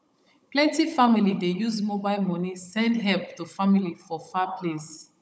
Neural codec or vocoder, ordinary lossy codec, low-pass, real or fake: codec, 16 kHz, 16 kbps, FunCodec, trained on Chinese and English, 50 frames a second; none; none; fake